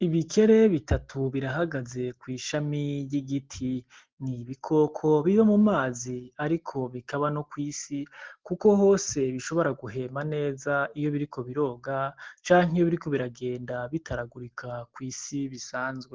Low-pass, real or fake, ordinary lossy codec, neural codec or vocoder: 7.2 kHz; real; Opus, 16 kbps; none